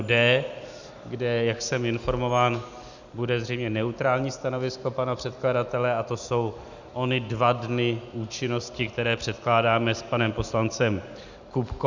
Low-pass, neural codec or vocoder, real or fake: 7.2 kHz; none; real